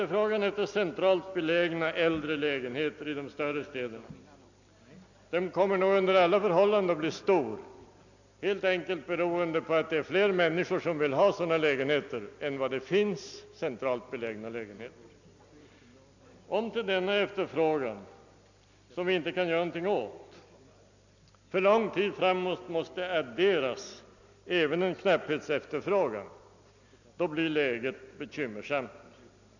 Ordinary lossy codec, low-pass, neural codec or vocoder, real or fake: none; 7.2 kHz; none; real